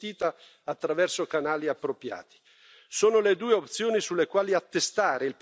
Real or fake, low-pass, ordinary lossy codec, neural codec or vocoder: real; none; none; none